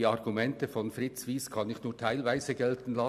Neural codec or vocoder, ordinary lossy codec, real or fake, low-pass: none; none; real; 14.4 kHz